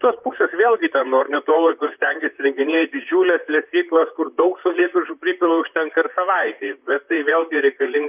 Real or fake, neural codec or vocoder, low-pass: fake; vocoder, 44.1 kHz, 128 mel bands, Pupu-Vocoder; 3.6 kHz